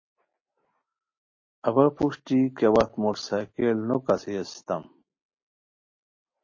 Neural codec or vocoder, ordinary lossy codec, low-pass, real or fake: none; MP3, 32 kbps; 7.2 kHz; real